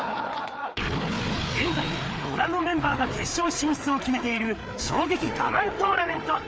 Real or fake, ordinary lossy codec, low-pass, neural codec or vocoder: fake; none; none; codec, 16 kHz, 4 kbps, FreqCodec, larger model